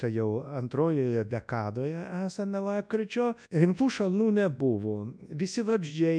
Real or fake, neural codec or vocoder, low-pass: fake; codec, 24 kHz, 0.9 kbps, WavTokenizer, large speech release; 9.9 kHz